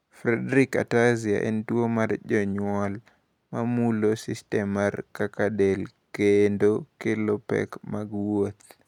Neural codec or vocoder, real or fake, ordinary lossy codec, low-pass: none; real; none; 19.8 kHz